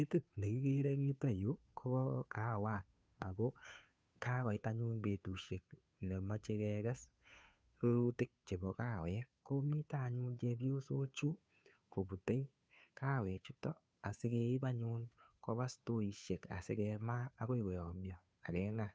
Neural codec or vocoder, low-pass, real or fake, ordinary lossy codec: codec, 16 kHz, 2 kbps, FunCodec, trained on Chinese and English, 25 frames a second; none; fake; none